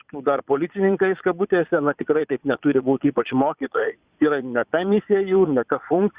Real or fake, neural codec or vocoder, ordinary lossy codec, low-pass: fake; vocoder, 22.05 kHz, 80 mel bands, Vocos; Opus, 64 kbps; 3.6 kHz